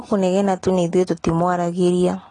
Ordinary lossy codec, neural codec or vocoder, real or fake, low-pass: AAC, 32 kbps; none; real; 10.8 kHz